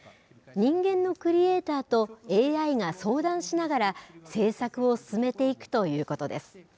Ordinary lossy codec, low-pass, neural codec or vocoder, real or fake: none; none; none; real